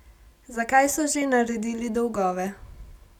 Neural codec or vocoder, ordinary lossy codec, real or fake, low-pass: none; none; real; 19.8 kHz